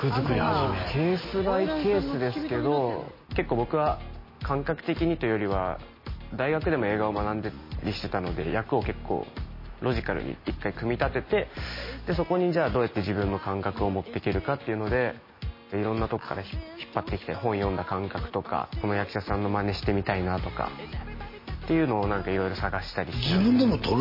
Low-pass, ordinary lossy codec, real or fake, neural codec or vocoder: 5.4 kHz; MP3, 24 kbps; real; none